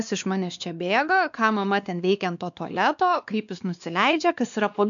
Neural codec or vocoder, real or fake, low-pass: codec, 16 kHz, 2 kbps, X-Codec, WavLM features, trained on Multilingual LibriSpeech; fake; 7.2 kHz